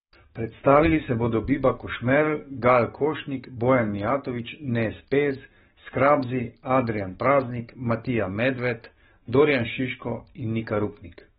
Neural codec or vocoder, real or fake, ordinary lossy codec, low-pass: codec, 44.1 kHz, 7.8 kbps, DAC; fake; AAC, 16 kbps; 19.8 kHz